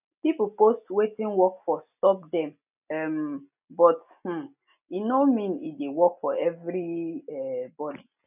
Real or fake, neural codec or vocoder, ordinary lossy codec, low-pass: real; none; none; 3.6 kHz